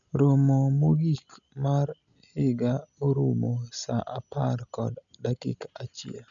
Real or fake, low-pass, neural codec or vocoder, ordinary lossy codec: real; 7.2 kHz; none; none